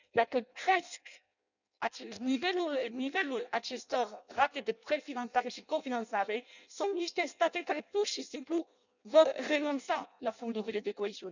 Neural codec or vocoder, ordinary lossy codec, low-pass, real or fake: codec, 16 kHz in and 24 kHz out, 0.6 kbps, FireRedTTS-2 codec; none; 7.2 kHz; fake